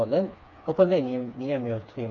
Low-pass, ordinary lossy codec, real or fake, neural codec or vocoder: 7.2 kHz; none; fake; codec, 16 kHz, 4 kbps, FreqCodec, smaller model